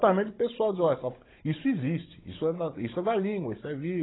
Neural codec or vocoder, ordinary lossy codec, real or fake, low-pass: codec, 16 kHz, 16 kbps, FunCodec, trained on Chinese and English, 50 frames a second; AAC, 16 kbps; fake; 7.2 kHz